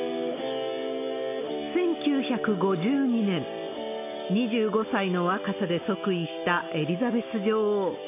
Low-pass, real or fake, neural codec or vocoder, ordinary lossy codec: 3.6 kHz; real; none; AAC, 24 kbps